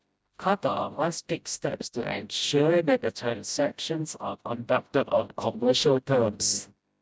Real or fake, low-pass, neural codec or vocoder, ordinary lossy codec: fake; none; codec, 16 kHz, 0.5 kbps, FreqCodec, smaller model; none